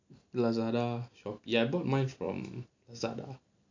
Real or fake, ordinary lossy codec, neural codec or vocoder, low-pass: real; AAC, 48 kbps; none; 7.2 kHz